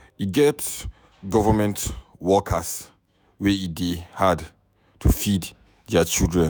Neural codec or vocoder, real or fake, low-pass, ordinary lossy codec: autoencoder, 48 kHz, 128 numbers a frame, DAC-VAE, trained on Japanese speech; fake; none; none